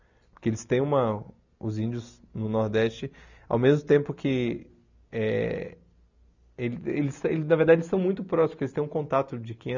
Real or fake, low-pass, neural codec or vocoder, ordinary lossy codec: real; 7.2 kHz; none; none